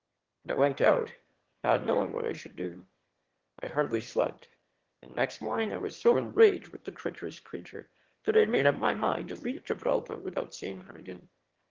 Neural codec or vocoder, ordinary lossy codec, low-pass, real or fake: autoencoder, 22.05 kHz, a latent of 192 numbers a frame, VITS, trained on one speaker; Opus, 16 kbps; 7.2 kHz; fake